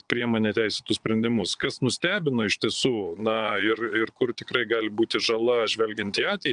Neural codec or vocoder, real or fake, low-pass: vocoder, 22.05 kHz, 80 mel bands, Vocos; fake; 9.9 kHz